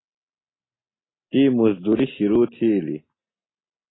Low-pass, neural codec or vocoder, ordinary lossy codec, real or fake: 7.2 kHz; none; AAC, 16 kbps; real